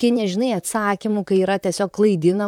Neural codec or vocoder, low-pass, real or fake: vocoder, 44.1 kHz, 128 mel bands, Pupu-Vocoder; 19.8 kHz; fake